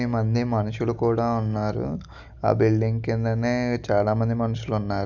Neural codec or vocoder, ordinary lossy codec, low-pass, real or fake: none; none; 7.2 kHz; real